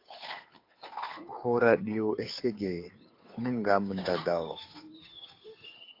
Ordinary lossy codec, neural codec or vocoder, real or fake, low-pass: AAC, 32 kbps; codec, 16 kHz, 2 kbps, FunCodec, trained on Chinese and English, 25 frames a second; fake; 5.4 kHz